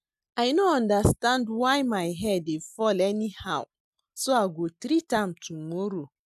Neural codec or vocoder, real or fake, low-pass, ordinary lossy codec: none; real; 14.4 kHz; none